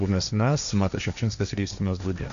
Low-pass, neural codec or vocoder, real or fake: 7.2 kHz; codec, 16 kHz, 1.1 kbps, Voila-Tokenizer; fake